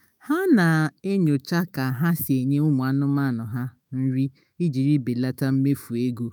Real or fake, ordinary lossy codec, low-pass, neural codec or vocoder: fake; none; none; autoencoder, 48 kHz, 128 numbers a frame, DAC-VAE, trained on Japanese speech